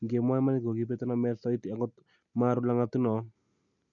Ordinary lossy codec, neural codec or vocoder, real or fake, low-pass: none; none; real; 7.2 kHz